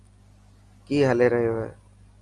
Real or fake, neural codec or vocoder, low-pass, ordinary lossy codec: real; none; 10.8 kHz; Opus, 32 kbps